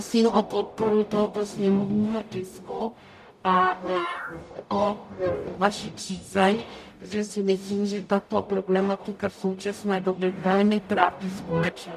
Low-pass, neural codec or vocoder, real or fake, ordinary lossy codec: 14.4 kHz; codec, 44.1 kHz, 0.9 kbps, DAC; fake; MP3, 96 kbps